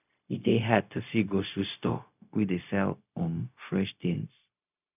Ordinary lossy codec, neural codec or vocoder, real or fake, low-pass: none; codec, 16 kHz, 0.4 kbps, LongCat-Audio-Codec; fake; 3.6 kHz